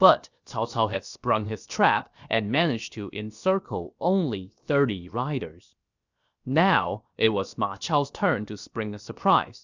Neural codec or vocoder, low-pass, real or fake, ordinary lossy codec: codec, 16 kHz, about 1 kbps, DyCAST, with the encoder's durations; 7.2 kHz; fake; Opus, 64 kbps